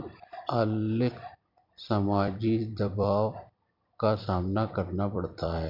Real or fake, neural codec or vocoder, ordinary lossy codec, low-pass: fake; vocoder, 44.1 kHz, 128 mel bands every 256 samples, BigVGAN v2; MP3, 48 kbps; 5.4 kHz